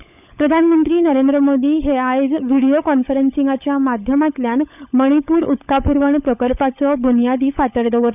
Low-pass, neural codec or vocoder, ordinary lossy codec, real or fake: 3.6 kHz; codec, 16 kHz, 16 kbps, FunCodec, trained on LibriTTS, 50 frames a second; none; fake